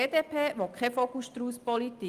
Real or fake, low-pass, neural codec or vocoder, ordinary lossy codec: real; 14.4 kHz; none; Opus, 32 kbps